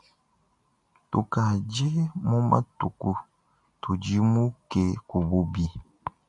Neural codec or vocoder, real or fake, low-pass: none; real; 10.8 kHz